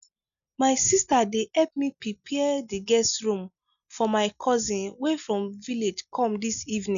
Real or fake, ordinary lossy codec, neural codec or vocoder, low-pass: real; none; none; 7.2 kHz